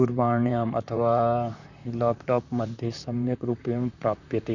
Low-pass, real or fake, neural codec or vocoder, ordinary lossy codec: 7.2 kHz; fake; vocoder, 44.1 kHz, 128 mel bands, Pupu-Vocoder; none